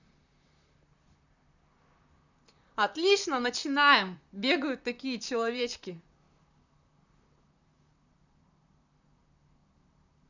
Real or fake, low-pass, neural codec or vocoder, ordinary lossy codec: fake; 7.2 kHz; vocoder, 44.1 kHz, 128 mel bands, Pupu-Vocoder; none